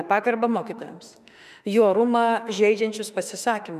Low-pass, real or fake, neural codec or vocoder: 14.4 kHz; fake; autoencoder, 48 kHz, 32 numbers a frame, DAC-VAE, trained on Japanese speech